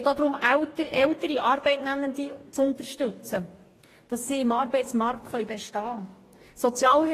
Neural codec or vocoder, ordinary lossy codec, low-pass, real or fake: codec, 44.1 kHz, 2.6 kbps, DAC; AAC, 48 kbps; 14.4 kHz; fake